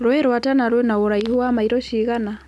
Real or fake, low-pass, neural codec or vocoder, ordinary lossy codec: real; none; none; none